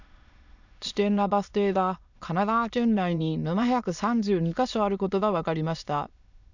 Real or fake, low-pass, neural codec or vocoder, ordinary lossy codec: fake; 7.2 kHz; autoencoder, 22.05 kHz, a latent of 192 numbers a frame, VITS, trained on many speakers; none